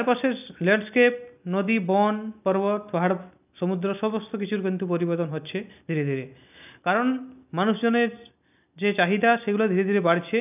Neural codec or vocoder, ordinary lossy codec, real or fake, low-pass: none; none; real; 3.6 kHz